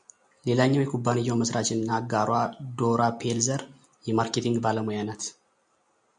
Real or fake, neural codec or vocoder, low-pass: real; none; 9.9 kHz